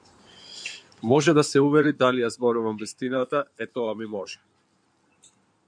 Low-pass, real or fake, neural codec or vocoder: 9.9 kHz; fake; codec, 16 kHz in and 24 kHz out, 2.2 kbps, FireRedTTS-2 codec